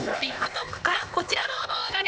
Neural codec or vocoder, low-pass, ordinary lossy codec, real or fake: codec, 16 kHz, 0.8 kbps, ZipCodec; none; none; fake